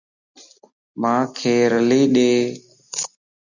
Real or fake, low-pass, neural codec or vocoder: real; 7.2 kHz; none